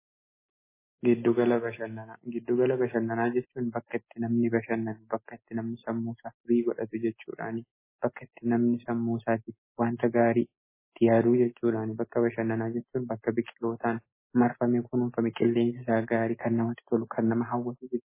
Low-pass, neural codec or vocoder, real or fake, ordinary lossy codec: 3.6 kHz; none; real; MP3, 16 kbps